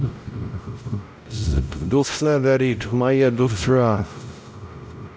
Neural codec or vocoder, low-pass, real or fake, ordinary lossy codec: codec, 16 kHz, 0.5 kbps, X-Codec, WavLM features, trained on Multilingual LibriSpeech; none; fake; none